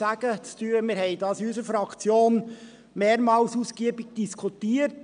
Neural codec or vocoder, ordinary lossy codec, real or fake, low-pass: none; none; real; 9.9 kHz